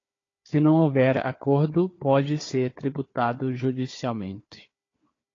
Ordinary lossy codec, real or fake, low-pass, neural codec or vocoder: AAC, 32 kbps; fake; 7.2 kHz; codec, 16 kHz, 4 kbps, FunCodec, trained on Chinese and English, 50 frames a second